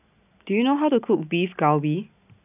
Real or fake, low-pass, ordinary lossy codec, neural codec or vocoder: real; 3.6 kHz; none; none